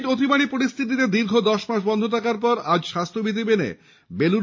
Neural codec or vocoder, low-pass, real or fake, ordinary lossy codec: none; 7.2 kHz; real; MP3, 32 kbps